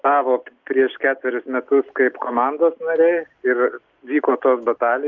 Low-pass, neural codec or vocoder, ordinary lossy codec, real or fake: 7.2 kHz; none; Opus, 24 kbps; real